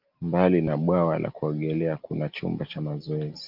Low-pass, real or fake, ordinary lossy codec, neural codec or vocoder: 5.4 kHz; real; Opus, 24 kbps; none